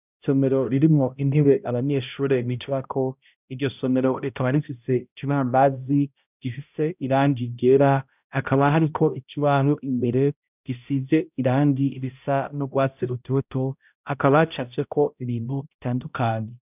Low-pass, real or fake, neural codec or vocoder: 3.6 kHz; fake; codec, 16 kHz, 0.5 kbps, X-Codec, HuBERT features, trained on balanced general audio